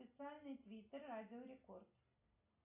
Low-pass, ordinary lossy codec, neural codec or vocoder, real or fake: 3.6 kHz; AAC, 24 kbps; none; real